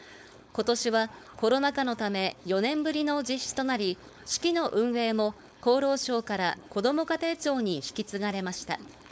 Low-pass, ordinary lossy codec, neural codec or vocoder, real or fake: none; none; codec, 16 kHz, 4.8 kbps, FACodec; fake